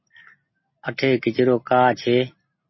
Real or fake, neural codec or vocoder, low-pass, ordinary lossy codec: real; none; 7.2 kHz; MP3, 24 kbps